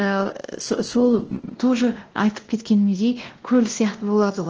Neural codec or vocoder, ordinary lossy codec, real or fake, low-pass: codec, 16 kHz, 1 kbps, X-Codec, WavLM features, trained on Multilingual LibriSpeech; Opus, 16 kbps; fake; 7.2 kHz